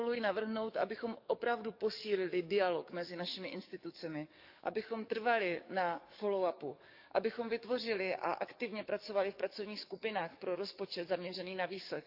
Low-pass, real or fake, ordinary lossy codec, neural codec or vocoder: 5.4 kHz; fake; none; codec, 44.1 kHz, 7.8 kbps, DAC